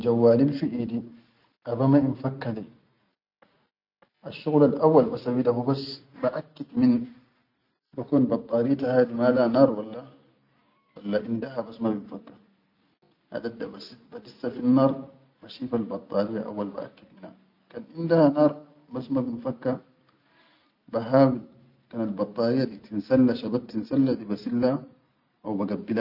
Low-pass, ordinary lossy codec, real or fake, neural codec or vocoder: 5.4 kHz; none; real; none